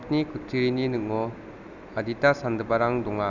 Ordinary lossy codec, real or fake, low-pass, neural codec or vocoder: none; real; 7.2 kHz; none